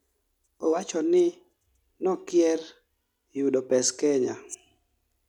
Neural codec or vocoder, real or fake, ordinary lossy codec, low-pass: none; real; none; 19.8 kHz